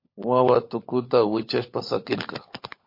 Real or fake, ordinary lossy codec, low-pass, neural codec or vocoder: fake; MP3, 32 kbps; 5.4 kHz; codec, 16 kHz, 16 kbps, FunCodec, trained on LibriTTS, 50 frames a second